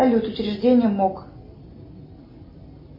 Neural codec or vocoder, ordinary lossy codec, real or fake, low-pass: none; MP3, 24 kbps; real; 5.4 kHz